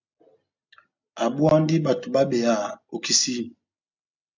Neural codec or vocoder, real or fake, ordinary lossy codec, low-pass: none; real; MP3, 64 kbps; 7.2 kHz